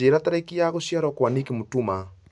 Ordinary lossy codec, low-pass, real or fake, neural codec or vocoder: MP3, 96 kbps; 10.8 kHz; real; none